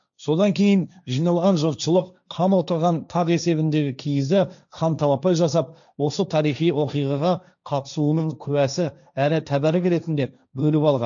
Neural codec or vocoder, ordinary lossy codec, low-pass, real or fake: codec, 16 kHz, 1.1 kbps, Voila-Tokenizer; none; 7.2 kHz; fake